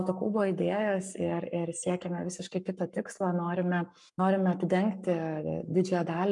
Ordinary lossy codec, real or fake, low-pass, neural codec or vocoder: MP3, 96 kbps; fake; 10.8 kHz; codec, 44.1 kHz, 7.8 kbps, Pupu-Codec